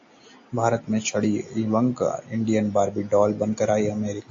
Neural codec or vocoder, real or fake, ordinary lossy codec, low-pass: none; real; AAC, 48 kbps; 7.2 kHz